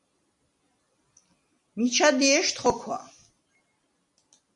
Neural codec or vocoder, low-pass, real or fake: none; 10.8 kHz; real